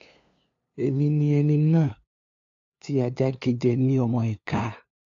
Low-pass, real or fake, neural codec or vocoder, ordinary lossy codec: 7.2 kHz; fake; codec, 16 kHz, 2 kbps, FunCodec, trained on LibriTTS, 25 frames a second; AAC, 48 kbps